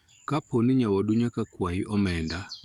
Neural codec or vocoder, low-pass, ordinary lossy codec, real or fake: codec, 44.1 kHz, 7.8 kbps, DAC; 19.8 kHz; none; fake